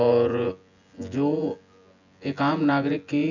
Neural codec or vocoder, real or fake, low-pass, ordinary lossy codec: vocoder, 24 kHz, 100 mel bands, Vocos; fake; 7.2 kHz; none